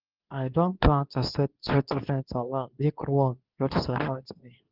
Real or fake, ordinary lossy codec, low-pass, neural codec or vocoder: fake; Opus, 32 kbps; 5.4 kHz; codec, 24 kHz, 0.9 kbps, WavTokenizer, medium speech release version 2